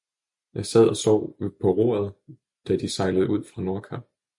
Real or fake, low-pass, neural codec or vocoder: real; 10.8 kHz; none